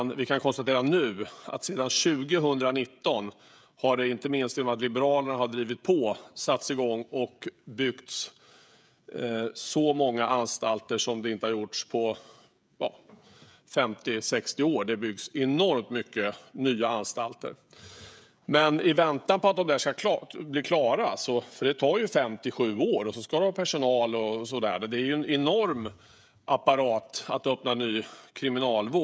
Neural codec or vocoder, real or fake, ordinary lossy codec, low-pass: codec, 16 kHz, 16 kbps, FreqCodec, smaller model; fake; none; none